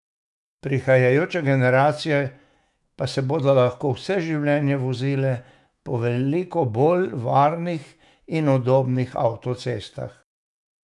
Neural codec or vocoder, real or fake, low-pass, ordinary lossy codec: autoencoder, 48 kHz, 128 numbers a frame, DAC-VAE, trained on Japanese speech; fake; 10.8 kHz; none